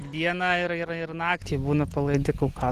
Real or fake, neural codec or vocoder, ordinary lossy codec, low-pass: real; none; Opus, 16 kbps; 14.4 kHz